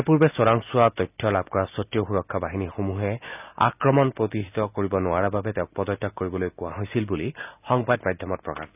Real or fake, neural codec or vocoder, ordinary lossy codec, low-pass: real; none; none; 3.6 kHz